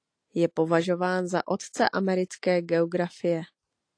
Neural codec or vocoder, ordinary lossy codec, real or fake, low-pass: none; AAC, 48 kbps; real; 9.9 kHz